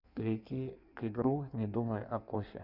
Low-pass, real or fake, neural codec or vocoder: 5.4 kHz; fake; codec, 16 kHz in and 24 kHz out, 1.1 kbps, FireRedTTS-2 codec